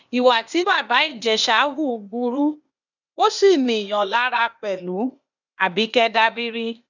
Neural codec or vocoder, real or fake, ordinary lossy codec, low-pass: codec, 16 kHz, 0.8 kbps, ZipCodec; fake; none; 7.2 kHz